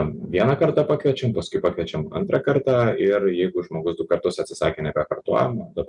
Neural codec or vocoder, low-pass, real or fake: none; 10.8 kHz; real